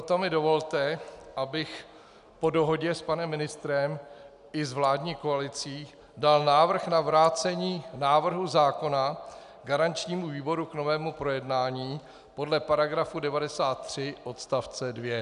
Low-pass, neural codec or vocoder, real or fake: 10.8 kHz; none; real